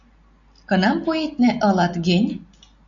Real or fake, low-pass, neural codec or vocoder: real; 7.2 kHz; none